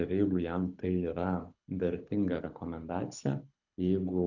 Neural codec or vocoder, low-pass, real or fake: codec, 24 kHz, 6 kbps, HILCodec; 7.2 kHz; fake